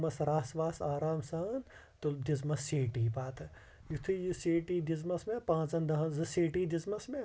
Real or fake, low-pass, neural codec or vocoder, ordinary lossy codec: real; none; none; none